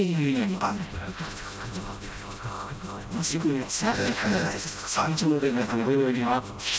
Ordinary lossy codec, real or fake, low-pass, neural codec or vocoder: none; fake; none; codec, 16 kHz, 0.5 kbps, FreqCodec, smaller model